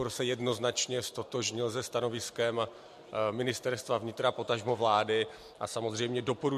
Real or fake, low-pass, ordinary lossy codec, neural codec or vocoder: real; 14.4 kHz; MP3, 64 kbps; none